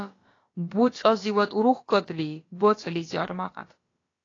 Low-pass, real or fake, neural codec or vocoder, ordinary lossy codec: 7.2 kHz; fake; codec, 16 kHz, about 1 kbps, DyCAST, with the encoder's durations; AAC, 32 kbps